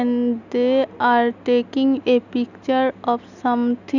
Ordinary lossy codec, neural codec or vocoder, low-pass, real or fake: none; none; 7.2 kHz; real